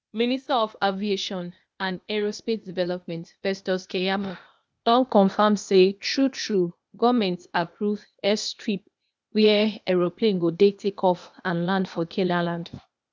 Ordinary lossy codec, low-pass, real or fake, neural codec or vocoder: none; none; fake; codec, 16 kHz, 0.8 kbps, ZipCodec